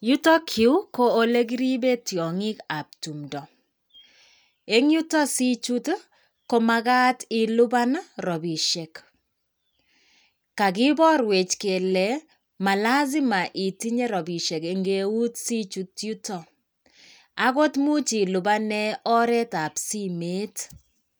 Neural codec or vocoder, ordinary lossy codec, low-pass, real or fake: none; none; none; real